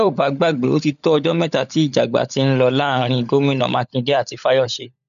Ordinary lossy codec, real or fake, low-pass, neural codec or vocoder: none; fake; 7.2 kHz; codec, 16 kHz, 16 kbps, FunCodec, trained on LibriTTS, 50 frames a second